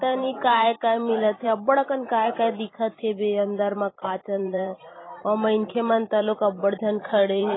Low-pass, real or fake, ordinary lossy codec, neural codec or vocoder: 7.2 kHz; fake; AAC, 16 kbps; vocoder, 44.1 kHz, 128 mel bands every 512 samples, BigVGAN v2